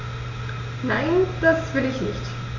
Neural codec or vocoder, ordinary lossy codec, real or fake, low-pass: none; none; real; 7.2 kHz